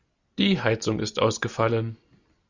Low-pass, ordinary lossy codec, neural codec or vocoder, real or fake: 7.2 kHz; Opus, 64 kbps; none; real